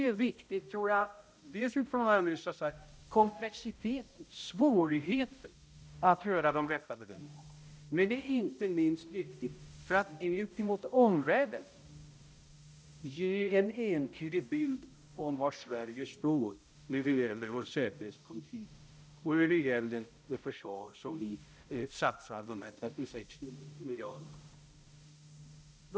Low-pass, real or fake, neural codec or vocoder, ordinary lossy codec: none; fake; codec, 16 kHz, 0.5 kbps, X-Codec, HuBERT features, trained on balanced general audio; none